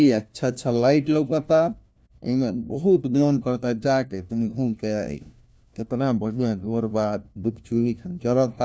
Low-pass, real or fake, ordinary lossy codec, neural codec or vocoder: none; fake; none; codec, 16 kHz, 1 kbps, FunCodec, trained on LibriTTS, 50 frames a second